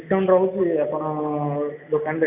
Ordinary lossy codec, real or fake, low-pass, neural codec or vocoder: none; real; 3.6 kHz; none